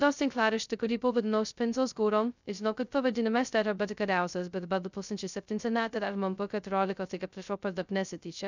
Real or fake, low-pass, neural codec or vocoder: fake; 7.2 kHz; codec, 16 kHz, 0.2 kbps, FocalCodec